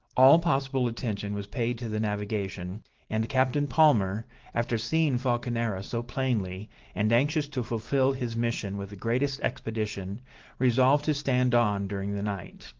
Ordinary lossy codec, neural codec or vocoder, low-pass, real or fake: Opus, 16 kbps; none; 7.2 kHz; real